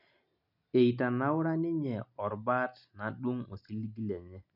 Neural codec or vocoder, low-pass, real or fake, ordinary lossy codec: none; 5.4 kHz; real; none